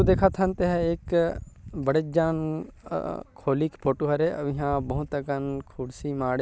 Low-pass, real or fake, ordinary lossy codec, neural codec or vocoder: none; real; none; none